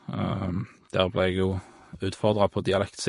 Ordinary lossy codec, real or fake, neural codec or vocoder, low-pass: MP3, 48 kbps; fake; vocoder, 24 kHz, 100 mel bands, Vocos; 10.8 kHz